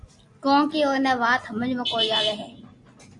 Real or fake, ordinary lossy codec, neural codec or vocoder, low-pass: real; AAC, 48 kbps; none; 10.8 kHz